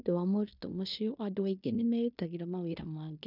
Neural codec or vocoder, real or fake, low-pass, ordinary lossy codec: codec, 16 kHz in and 24 kHz out, 0.9 kbps, LongCat-Audio-Codec, fine tuned four codebook decoder; fake; 5.4 kHz; none